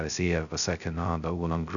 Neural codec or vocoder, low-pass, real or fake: codec, 16 kHz, 0.2 kbps, FocalCodec; 7.2 kHz; fake